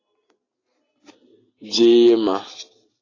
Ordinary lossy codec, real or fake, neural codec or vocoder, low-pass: AAC, 32 kbps; real; none; 7.2 kHz